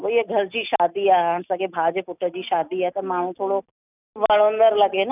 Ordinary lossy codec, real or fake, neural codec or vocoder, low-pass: none; real; none; 3.6 kHz